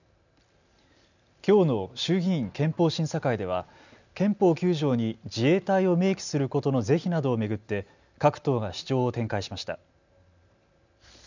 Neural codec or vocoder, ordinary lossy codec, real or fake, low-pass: none; none; real; 7.2 kHz